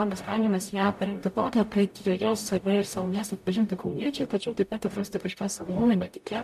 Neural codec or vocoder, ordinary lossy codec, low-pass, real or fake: codec, 44.1 kHz, 0.9 kbps, DAC; MP3, 96 kbps; 14.4 kHz; fake